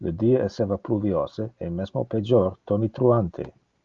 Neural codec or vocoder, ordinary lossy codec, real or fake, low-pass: none; Opus, 32 kbps; real; 7.2 kHz